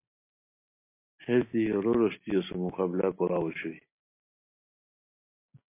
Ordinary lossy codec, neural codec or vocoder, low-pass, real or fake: MP3, 24 kbps; none; 3.6 kHz; real